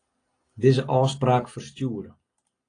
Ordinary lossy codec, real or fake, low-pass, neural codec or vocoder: AAC, 32 kbps; real; 9.9 kHz; none